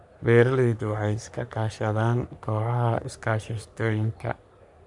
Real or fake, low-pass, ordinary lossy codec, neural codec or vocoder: fake; 10.8 kHz; none; codec, 44.1 kHz, 3.4 kbps, Pupu-Codec